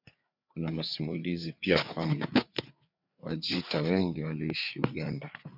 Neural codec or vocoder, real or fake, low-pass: codec, 16 kHz, 4 kbps, FreqCodec, larger model; fake; 5.4 kHz